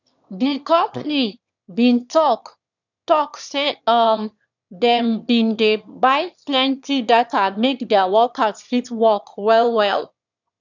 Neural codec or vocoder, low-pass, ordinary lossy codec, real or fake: autoencoder, 22.05 kHz, a latent of 192 numbers a frame, VITS, trained on one speaker; 7.2 kHz; none; fake